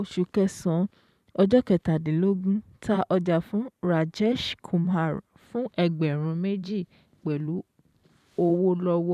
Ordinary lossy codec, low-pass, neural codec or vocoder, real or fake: none; 14.4 kHz; vocoder, 44.1 kHz, 128 mel bands every 512 samples, BigVGAN v2; fake